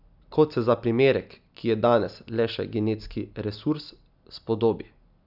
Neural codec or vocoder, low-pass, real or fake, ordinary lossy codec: none; 5.4 kHz; real; none